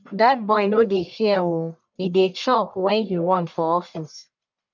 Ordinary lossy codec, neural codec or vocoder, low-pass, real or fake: none; codec, 44.1 kHz, 1.7 kbps, Pupu-Codec; 7.2 kHz; fake